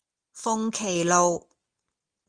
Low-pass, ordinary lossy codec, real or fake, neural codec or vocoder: 9.9 kHz; Opus, 24 kbps; real; none